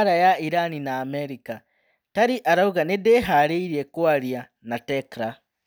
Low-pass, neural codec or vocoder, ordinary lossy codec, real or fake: none; none; none; real